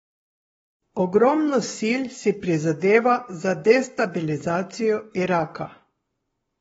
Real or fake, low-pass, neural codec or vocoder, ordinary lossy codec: fake; 19.8 kHz; codec, 44.1 kHz, 7.8 kbps, Pupu-Codec; AAC, 24 kbps